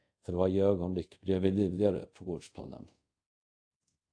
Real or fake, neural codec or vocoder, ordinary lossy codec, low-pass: fake; codec, 24 kHz, 0.5 kbps, DualCodec; AAC, 48 kbps; 9.9 kHz